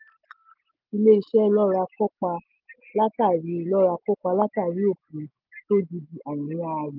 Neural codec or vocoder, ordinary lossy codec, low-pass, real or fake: none; Opus, 24 kbps; 5.4 kHz; real